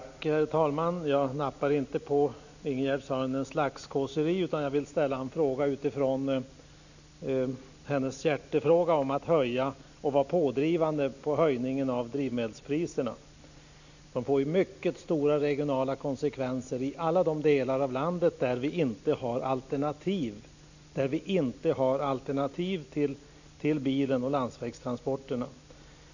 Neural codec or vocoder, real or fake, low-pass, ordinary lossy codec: none; real; 7.2 kHz; none